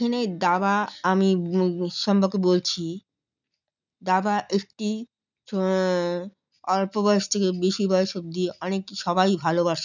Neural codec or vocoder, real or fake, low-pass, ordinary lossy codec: none; real; 7.2 kHz; none